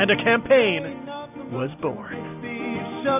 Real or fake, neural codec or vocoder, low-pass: real; none; 3.6 kHz